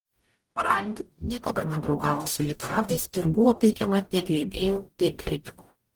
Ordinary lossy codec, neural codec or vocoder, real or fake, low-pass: Opus, 24 kbps; codec, 44.1 kHz, 0.9 kbps, DAC; fake; 19.8 kHz